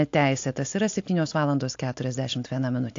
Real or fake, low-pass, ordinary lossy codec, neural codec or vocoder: real; 7.2 kHz; AAC, 48 kbps; none